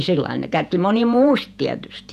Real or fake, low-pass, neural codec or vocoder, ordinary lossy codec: fake; 14.4 kHz; vocoder, 48 kHz, 128 mel bands, Vocos; none